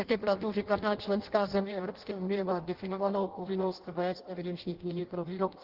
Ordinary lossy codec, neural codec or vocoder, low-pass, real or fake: Opus, 16 kbps; codec, 16 kHz in and 24 kHz out, 0.6 kbps, FireRedTTS-2 codec; 5.4 kHz; fake